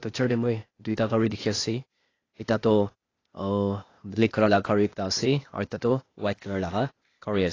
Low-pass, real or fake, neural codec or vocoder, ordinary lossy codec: 7.2 kHz; fake; codec, 16 kHz, 0.8 kbps, ZipCodec; AAC, 32 kbps